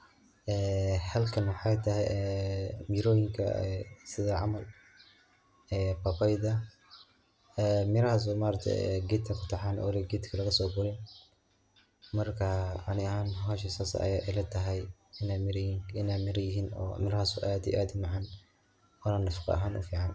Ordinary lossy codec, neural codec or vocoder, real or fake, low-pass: none; none; real; none